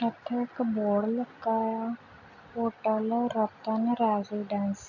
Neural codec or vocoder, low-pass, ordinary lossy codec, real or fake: none; 7.2 kHz; none; real